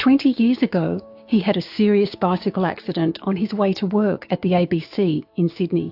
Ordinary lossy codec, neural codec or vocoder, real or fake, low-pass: AAC, 48 kbps; codec, 16 kHz, 6 kbps, DAC; fake; 5.4 kHz